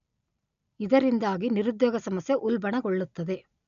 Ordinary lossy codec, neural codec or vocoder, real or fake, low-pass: none; none; real; 7.2 kHz